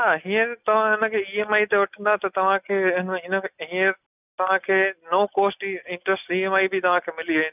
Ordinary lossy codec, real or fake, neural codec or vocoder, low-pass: none; real; none; 3.6 kHz